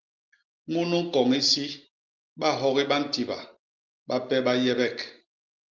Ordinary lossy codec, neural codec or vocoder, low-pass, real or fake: Opus, 32 kbps; none; 7.2 kHz; real